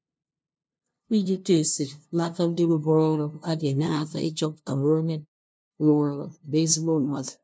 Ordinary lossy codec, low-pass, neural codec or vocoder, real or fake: none; none; codec, 16 kHz, 0.5 kbps, FunCodec, trained on LibriTTS, 25 frames a second; fake